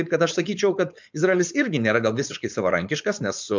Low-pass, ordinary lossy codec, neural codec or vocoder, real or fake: 7.2 kHz; MP3, 64 kbps; codec, 16 kHz, 4.8 kbps, FACodec; fake